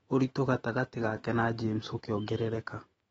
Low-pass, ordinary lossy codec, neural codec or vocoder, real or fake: 19.8 kHz; AAC, 24 kbps; autoencoder, 48 kHz, 128 numbers a frame, DAC-VAE, trained on Japanese speech; fake